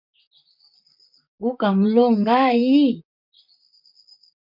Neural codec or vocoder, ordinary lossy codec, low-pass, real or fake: vocoder, 44.1 kHz, 128 mel bands, Pupu-Vocoder; MP3, 48 kbps; 5.4 kHz; fake